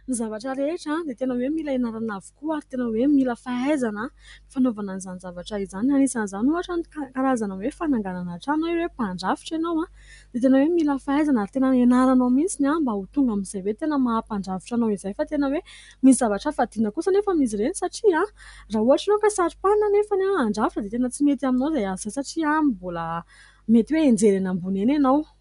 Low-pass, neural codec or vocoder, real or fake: 10.8 kHz; none; real